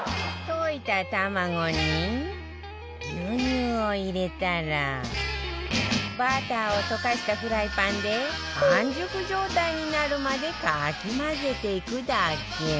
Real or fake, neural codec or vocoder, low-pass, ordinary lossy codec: real; none; none; none